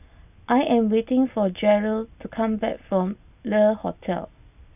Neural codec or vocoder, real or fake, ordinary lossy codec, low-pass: none; real; none; 3.6 kHz